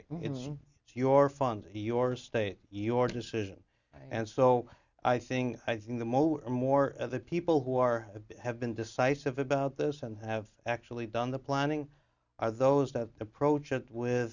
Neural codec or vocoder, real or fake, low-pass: none; real; 7.2 kHz